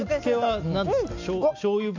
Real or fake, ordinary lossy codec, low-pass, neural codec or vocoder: real; MP3, 64 kbps; 7.2 kHz; none